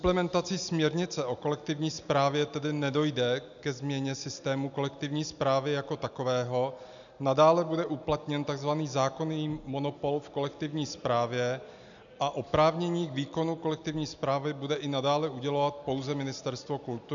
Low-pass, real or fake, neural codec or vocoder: 7.2 kHz; real; none